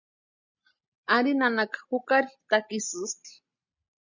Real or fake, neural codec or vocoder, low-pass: real; none; 7.2 kHz